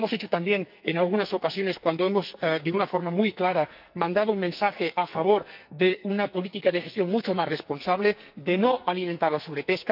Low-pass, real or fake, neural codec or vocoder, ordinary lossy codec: 5.4 kHz; fake; codec, 44.1 kHz, 2.6 kbps, SNAC; none